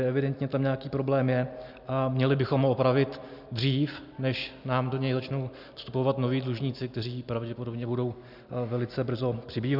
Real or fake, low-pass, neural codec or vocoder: real; 5.4 kHz; none